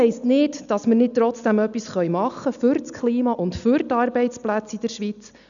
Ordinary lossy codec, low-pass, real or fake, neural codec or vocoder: none; 7.2 kHz; real; none